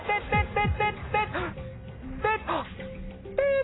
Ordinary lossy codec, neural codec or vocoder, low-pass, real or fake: AAC, 16 kbps; none; 7.2 kHz; real